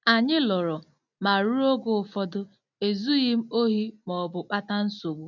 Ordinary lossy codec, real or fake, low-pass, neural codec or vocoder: none; real; 7.2 kHz; none